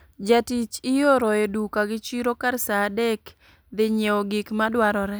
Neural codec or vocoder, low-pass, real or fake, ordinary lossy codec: none; none; real; none